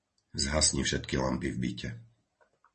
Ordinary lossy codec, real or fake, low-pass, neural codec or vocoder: MP3, 32 kbps; real; 10.8 kHz; none